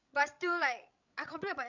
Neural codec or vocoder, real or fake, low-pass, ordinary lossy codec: codec, 16 kHz, 16 kbps, FreqCodec, larger model; fake; 7.2 kHz; none